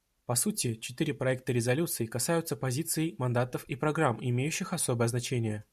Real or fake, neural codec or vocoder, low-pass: real; none; 14.4 kHz